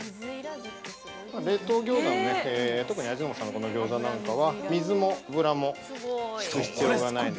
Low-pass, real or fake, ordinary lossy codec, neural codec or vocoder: none; real; none; none